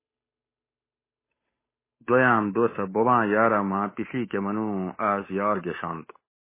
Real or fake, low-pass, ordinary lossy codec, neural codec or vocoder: fake; 3.6 kHz; MP3, 16 kbps; codec, 16 kHz, 8 kbps, FunCodec, trained on Chinese and English, 25 frames a second